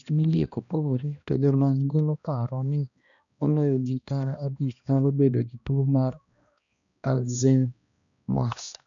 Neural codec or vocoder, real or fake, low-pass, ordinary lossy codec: codec, 16 kHz, 1 kbps, X-Codec, HuBERT features, trained on balanced general audio; fake; 7.2 kHz; none